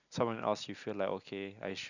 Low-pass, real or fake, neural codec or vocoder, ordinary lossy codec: 7.2 kHz; real; none; none